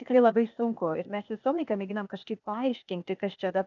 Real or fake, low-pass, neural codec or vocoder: fake; 7.2 kHz; codec, 16 kHz, 0.8 kbps, ZipCodec